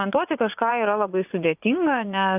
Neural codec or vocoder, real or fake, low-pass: vocoder, 24 kHz, 100 mel bands, Vocos; fake; 3.6 kHz